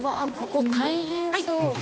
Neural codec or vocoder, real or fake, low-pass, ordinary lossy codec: codec, 16 kHz, 2 kbps, X-Codec, HuBERT features, trained on balanced general audio; fake; none; none